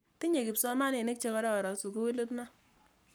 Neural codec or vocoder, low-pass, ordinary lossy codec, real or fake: codec, 44.1 kHz, 7.8 kbps, Pupu-Codec; none; none; fake